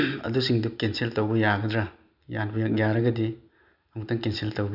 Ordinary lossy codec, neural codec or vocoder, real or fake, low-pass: none; none; real; 5.4 kHz